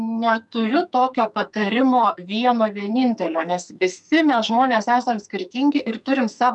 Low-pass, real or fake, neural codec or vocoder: 10.8 kHz; fake; codec, 44.1 kHz, 2.6 kbps, SNAC